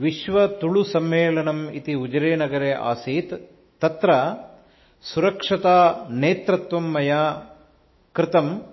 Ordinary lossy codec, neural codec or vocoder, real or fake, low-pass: MP3, 24 kbps; autoencoder, 48 kHz, 128 numbers a frame, DAC-VAE, trained on Japanese speech; fake; 7.2 kHz